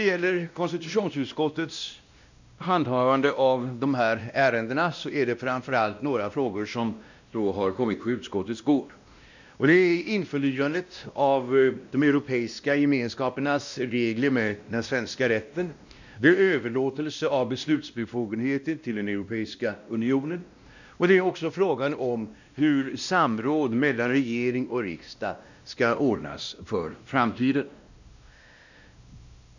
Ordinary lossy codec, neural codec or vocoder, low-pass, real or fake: none; codec, 16 kHz, 1 kbps, X-Codec, WavLM features, trained on Multilingual LibriSpeech; 7.2 kHz; fake